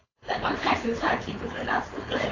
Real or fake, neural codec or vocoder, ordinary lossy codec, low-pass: fake; codec, 16 kHz, 4.8 kbps, FACodec; AAC, 32 kbps; 7.2 kHz